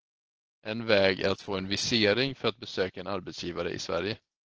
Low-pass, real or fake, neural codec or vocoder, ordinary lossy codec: 7.2 kHz; real; none; Opus, 16 kbps